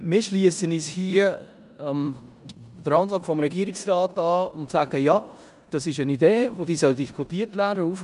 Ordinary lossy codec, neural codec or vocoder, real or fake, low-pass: none; codec, 16 kHz in and 24 kHz out, 0.9 kbps, LongCat-Audio-Codec, four codebook decoder; fake; 10.8 kHz